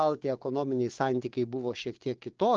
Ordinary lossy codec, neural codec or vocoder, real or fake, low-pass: Opus, 16 kbps; codec, 16 kHz, 6 kbps, DAC; fake; 7.2 kHz